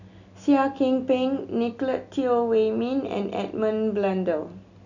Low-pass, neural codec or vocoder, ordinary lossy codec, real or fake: 7.2 kHz; none; none; real